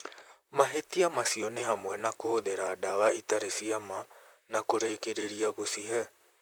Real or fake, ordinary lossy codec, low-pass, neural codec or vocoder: fake; none; none; vocoder, 44.1 kHz, 128 mel bands, Pupu-Vocoder